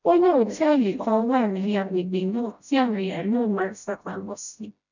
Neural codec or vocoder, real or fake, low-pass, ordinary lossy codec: codec, 16 kHz, 0.5 kbps, FreqCodec, smaller model; fake; 7.2 kHz; none